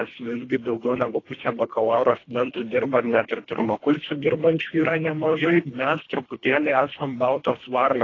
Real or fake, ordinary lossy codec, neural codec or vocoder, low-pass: fake; AAC, 32 kbps; codec, 24 kHz, 1.5 kbps, HILCodec; 7.2 kHz